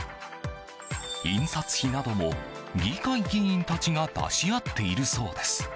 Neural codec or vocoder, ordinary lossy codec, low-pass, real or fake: none; none; none; real